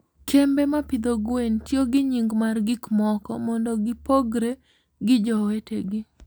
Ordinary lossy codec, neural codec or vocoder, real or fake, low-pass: none; none; real; none